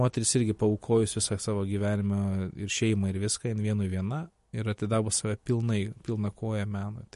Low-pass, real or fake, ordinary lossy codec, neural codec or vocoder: 14.4 kHz; real; MP3, 48 kbps; none